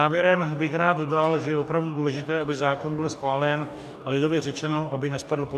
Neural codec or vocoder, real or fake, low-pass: codec, 44.1 kHz, 2.6 kbps, DAC; fake; 14.4 kHz